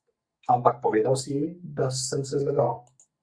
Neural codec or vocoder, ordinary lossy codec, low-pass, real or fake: codec, 44.1 kHz, 2.6 kbps, SNAC; Opus, 64 kbps; 9.9 kHz; fake